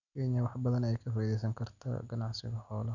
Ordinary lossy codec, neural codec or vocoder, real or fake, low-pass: none; none; real; 7.2 kHz